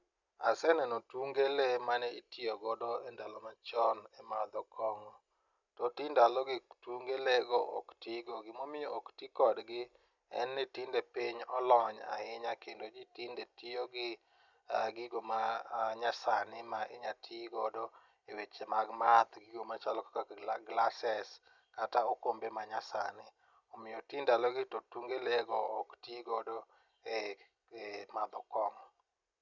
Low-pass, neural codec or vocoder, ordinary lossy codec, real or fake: 7.2 kHz; none; none; real